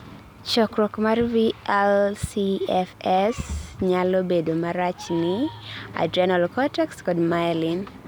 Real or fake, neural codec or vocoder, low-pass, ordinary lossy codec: real; none; none; none